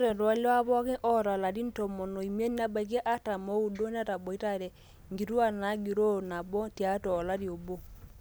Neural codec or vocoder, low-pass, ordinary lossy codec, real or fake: none; none; none; real